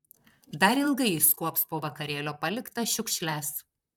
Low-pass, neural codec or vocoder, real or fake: 19.8 kHz; vocoder, 44.1 kHz, 128 mel bands, Pupu-Vocoder; fake